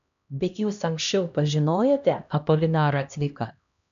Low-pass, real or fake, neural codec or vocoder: 7.2 kHz; fake; codec, 16 kHz, 1 kbps, X-Codec, HuBERT features, trained on LibriSpeech